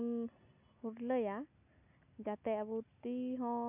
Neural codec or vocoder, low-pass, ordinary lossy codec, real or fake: none; 3.6 kHz; none; real